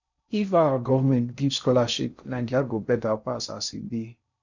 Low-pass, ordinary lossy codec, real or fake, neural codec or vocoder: 7.2 kHz; none; fake; codec, 16 kHz in and 24 kHz out, 0.6 kbps, FocalCodec, streaming, 4096 codes